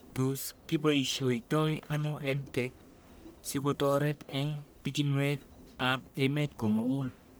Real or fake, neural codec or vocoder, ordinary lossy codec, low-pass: fake; codec, 44.1 kHz, 1.7 kbps, Pupu-Codec; none; none